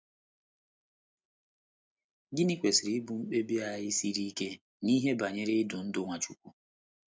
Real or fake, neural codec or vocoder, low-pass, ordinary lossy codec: real; none; none; none